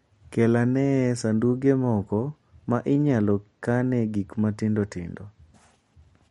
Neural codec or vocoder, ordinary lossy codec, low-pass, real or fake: none; MP3, 48 kbps; 19.8 kHz; real